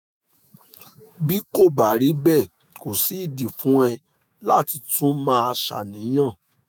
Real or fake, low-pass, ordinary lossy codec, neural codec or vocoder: fake; none; none; autoencoder, 48 kHz, 128 numbers a frame, DAC-VAE, trained on Japanese speech